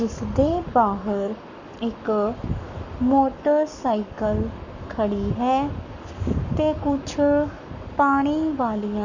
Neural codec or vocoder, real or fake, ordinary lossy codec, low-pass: codec, 44.1 kHz, 7.8 kbps, Pupu-Codec; fake; none; 7.2 kHz